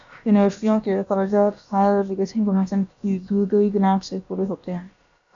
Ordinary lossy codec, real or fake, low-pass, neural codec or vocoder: AAC, 64 kbps; fake; 7.2 kHz; codec, 16 kHz, about 1 kbps, DyCAST, with the encoder's durations